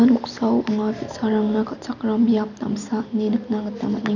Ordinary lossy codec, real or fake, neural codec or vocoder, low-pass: none; real; none; 7.2 kHz